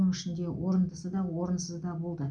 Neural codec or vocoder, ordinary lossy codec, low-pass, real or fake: none; none; 9.9 kHz; real